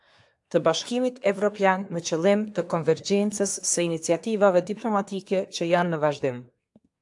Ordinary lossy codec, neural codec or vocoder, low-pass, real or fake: AAC, 64 kbps; codec, 24 kHz, 1 kbps, SNAC; 10.8 kHz; fake